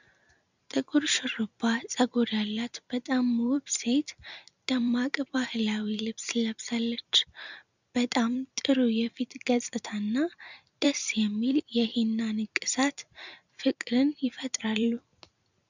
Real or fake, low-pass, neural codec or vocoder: fake; 7.2 kHz; vocoder, 44.1 kHz, 128 mel bands every 256 samples, BigVGAN v2